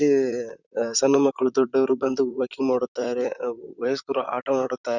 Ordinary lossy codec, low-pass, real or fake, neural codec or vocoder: none; 7.2 kHz; fake; vocoder, 44.1 kHz, 128 mel bands, Pupu-Vocoder